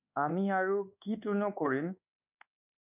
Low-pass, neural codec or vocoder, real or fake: 3.6 kHz; codec, 24 kHz, 1.2 kbps, DualCodec; fake